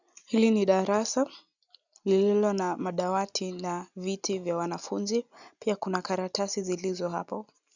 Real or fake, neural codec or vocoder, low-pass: real; none; 7.2 kHz